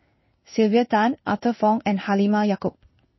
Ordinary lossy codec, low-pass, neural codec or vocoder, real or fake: MP3, 24 kbps; 7.2 kHz; codec, 16 kHz in and 24 kHz out, 1 kbps, XY-Tokenizer; fake